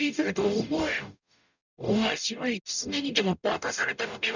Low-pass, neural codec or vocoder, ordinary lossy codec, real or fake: 7.2 kHz; codec, 44.1 kHz, 0.9 kbps, DAC; none; fake